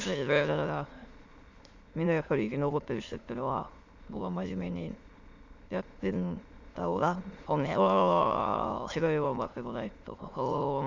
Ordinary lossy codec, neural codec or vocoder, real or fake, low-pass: MP3, 64 kbps; autoencoder, 22.05 kHz, a latent of 192 numbers a frame, VITS, trained on many speakers; fake; 7.2 kHz